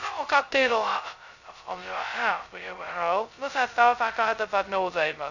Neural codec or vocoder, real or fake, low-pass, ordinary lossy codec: codec, 16 kHz, 0.2 kbps, FocalCodec; fake; 7.2 kHz; none